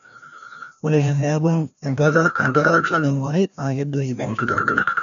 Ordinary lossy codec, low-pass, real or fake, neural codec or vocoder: none; 7.2 kHz; fake; codec, 16 kHz, 1 kbps, FreqCodec, larger model